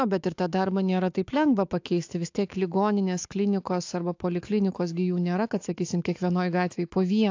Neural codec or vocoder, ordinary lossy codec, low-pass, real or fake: autoencoder, 48 kHz, 128 numbers a frame, DAC-VAE, trained on Japanese speech; AAC, 48 kbps; 7.2 kHz; fake